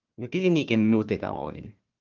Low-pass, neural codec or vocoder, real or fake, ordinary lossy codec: 7.2 kHz; codec, 16 kHz, 1 kbps, FunCodec, trained on Chinese and English, 50 frames a second; fake; Opus, 32 kbps